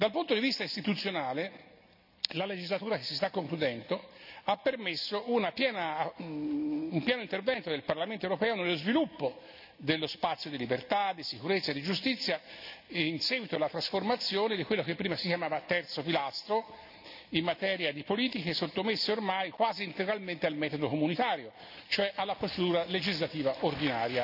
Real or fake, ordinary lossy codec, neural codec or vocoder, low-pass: real; none; none; 5.4 kHz